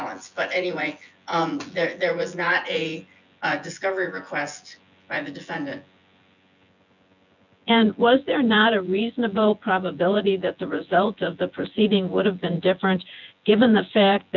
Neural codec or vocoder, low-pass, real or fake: vocoder, 24 kHz, 100 mel bands, Vocos; 7.2 kHz; fake